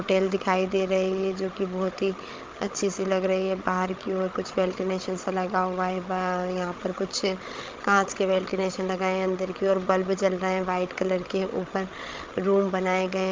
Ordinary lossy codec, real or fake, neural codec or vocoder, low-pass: Opus, 32 kbps; fake; codec, 16 kHz, 16 kbps, FreqCodec, larger model; 7.2 kHz